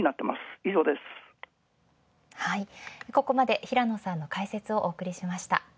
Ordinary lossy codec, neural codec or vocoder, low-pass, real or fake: none; none; none; real